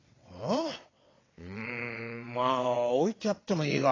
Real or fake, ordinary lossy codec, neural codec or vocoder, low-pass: fake; AAC, 32 kbps; vocoder, 22.05 kHz, 80 mel bands, WaveNeXt; 7.2 kHz